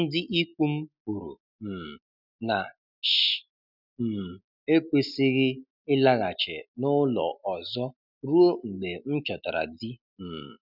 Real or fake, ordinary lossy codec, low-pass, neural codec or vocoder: real; none; 5.4 kHz; none